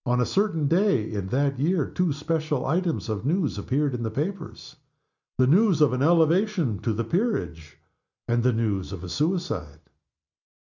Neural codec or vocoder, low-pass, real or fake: none; 7.2 kHz; real